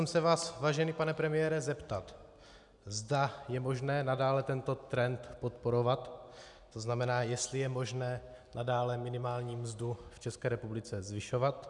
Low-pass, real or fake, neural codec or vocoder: 10.8 kHz; real; none